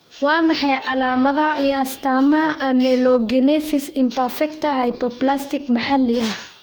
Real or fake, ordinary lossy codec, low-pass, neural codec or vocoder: fake; none; none; codec, 44.1 kHz, 2.6 kbps, DAC